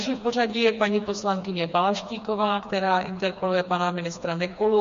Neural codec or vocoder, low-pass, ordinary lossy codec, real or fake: codec, 16 kHz, 2 kbps, FreqCodec, smaller model; 7.2 kHz; MP3, 48 kbps; fake